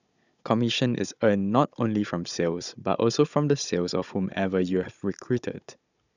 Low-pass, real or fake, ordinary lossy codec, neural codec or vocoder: 7.2 kHz; fake; none; codec, 16 kHz, 16 kbps, FunCodec, trained on Chinese and English, 50 frames a second